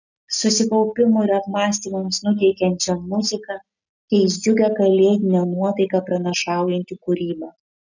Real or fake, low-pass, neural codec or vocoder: real; 7.2 kHz; none